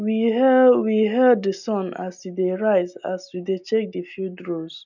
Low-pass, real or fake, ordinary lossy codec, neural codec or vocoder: 7.2 kHz; real; none; none